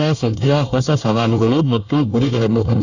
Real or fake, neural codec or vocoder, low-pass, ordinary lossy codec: fake; codec, 24 kHz, 1 kbps, SNAC; 7.2 kHz; none